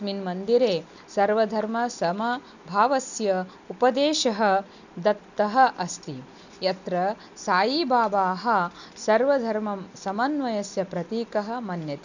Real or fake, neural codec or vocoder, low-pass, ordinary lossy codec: real; none; 7.2 kHz; none